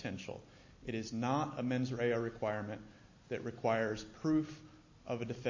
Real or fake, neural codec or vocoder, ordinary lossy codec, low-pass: real; none; MP3, 32 kbps; 7.2 kHz